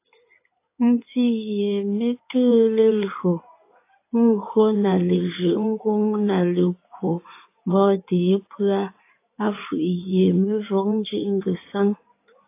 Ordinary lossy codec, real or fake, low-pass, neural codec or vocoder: AAC, 24 kbps; fake; 3.6 kHz; codec, 16 kHz in and 24 kHz out, 2.2 kbps, FireRedTTS-2 codec